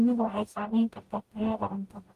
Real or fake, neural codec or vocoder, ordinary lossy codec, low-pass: fake; codec, 44.1 kHz, 0.9 kbps, DAC; Opus, 24 kbps; 14.4 kHz